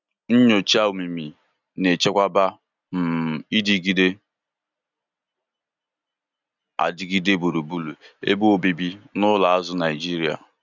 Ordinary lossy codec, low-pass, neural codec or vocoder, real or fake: none; 7.2 kHz; none; real